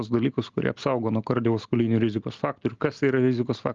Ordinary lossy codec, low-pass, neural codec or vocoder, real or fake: Opus, 32 kbps; 7.2 kHz; none; real